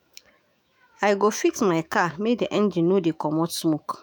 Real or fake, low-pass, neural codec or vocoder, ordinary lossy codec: fake; none; autoencoder, 48 kHz, 128 numbers a frame, DAC-VAE, trained on Japanese speech; none